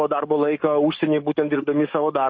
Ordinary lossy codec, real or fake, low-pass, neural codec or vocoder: MP3, 32 kbps; fake; 7.2 kHz; codec, 44.1 kHz, 7.8 kbps, DAC